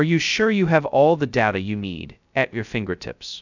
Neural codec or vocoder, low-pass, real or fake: codec, 16 kHz, 0.2 kbps, FocalCodec; 7.2 kHz; fake